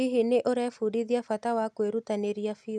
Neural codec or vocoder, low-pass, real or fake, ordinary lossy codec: none; none; real; none